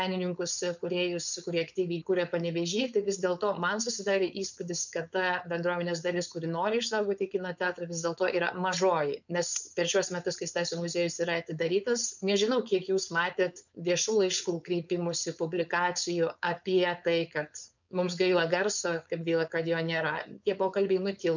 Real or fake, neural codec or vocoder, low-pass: fake; codec, 16 kHz, 4.8 kbps, FACodec; 7.2 kHz